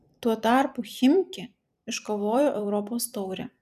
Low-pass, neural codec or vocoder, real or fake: 14.4 kHz; vocoder, 44.1 kHz, 128 mel bands every 256 samples, BigVGAN v2; fake